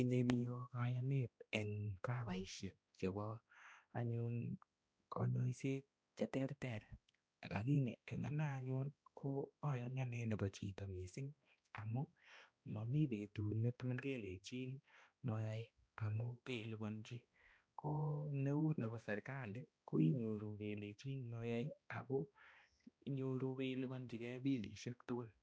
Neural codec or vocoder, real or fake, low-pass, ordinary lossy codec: codec, 16 kHz, 1 kbps, X-Codec, HuBERT features, trained on balanced general audio; fake; none; none